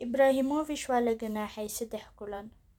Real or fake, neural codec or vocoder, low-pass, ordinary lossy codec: fake; vocoder, 44.1 kHz, 128 mel bands every 512 samples, BigVGAN v2; 19.8 kHz; none